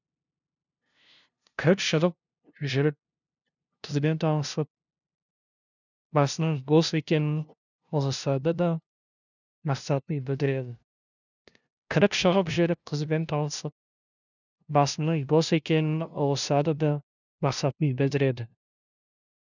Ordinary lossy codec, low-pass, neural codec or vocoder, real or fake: none; 7.2 kHz; codec, 16 kHz, 0.5 kbps, FunCodec, trained on LibriTTS, 25 frames a second; fake